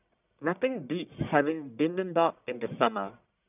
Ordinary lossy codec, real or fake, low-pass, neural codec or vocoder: none; fake; 3.6 kHz; codec, 44.1 kHz, 1.7 kbps, Pupu-Codec